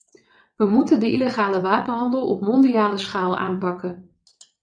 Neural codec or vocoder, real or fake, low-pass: vocoder, 22.05 kHz, 80 mel bands, WaveNeXt; fake; 9.9 kHz